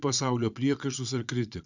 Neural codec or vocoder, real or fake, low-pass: vocoder, 22.05 kHz, 80 mel bands, Vocos; fake; 7.2 kHz